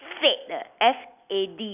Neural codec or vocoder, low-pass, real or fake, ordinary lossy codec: none; 3.6 kHz; real; none